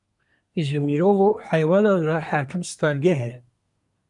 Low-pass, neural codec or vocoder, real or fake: 10.8 kHz; codec, 24 kHz, 1 kbps, SNAC; fake